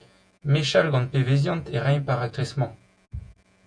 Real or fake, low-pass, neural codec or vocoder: fake; 9.9 kHz; vocoder, 48 kHz, 128 mel bands, Vocos